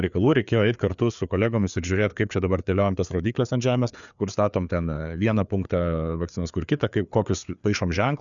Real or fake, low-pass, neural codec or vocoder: fake; 7.2 kHz; codec, 16 kHz, 4 kbps, FreqCodec, larger model